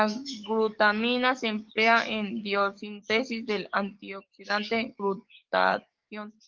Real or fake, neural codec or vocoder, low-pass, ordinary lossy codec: fake; codec, 44.1 kHz, 7.8 kbps, DAC; 7.2 kHz; Opus, 24 kbps